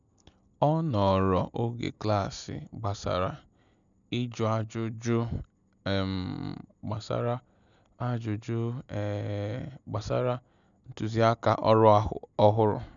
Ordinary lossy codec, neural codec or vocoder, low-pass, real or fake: none; none; 7.2 kHz; real